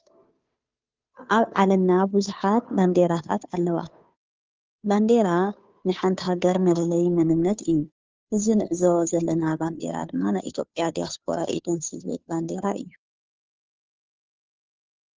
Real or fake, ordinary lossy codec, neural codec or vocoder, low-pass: fake; Opus, 24 kbps; codec, 16 kHz, 2 kbps, FunCodec, trained on Chinese and English, 25 frames a second; 7.2 kHz